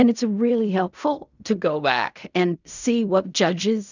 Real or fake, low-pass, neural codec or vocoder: fake; 7.2 kHz; codec, 16 kHz in and 24 kHz out, 0.4 kbps, LongCat-Audio-Codec, fine tuned four codebook decoder